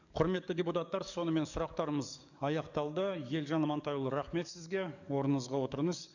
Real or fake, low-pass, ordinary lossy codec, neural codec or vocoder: fake; 7.2 kHz; none; codec, 44.1 kHz, 7.8 kbps, DAC